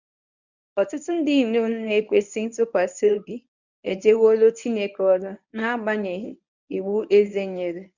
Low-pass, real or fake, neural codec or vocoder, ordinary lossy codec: 7.2 kHz; fake; codec, 24 kHz, 0.9 kbps, WavTokenizer, medium speech release version 1; none